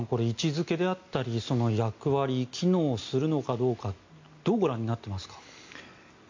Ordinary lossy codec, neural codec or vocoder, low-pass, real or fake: MP3, 48 kbps; none; 7.2 kHz; real